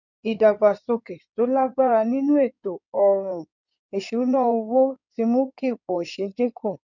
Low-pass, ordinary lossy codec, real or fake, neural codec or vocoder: 7.2 kHz; none; fake; codec, 16 kHz in and 24 kHz out, 2.2 kbps, FireRedTTS-2 codec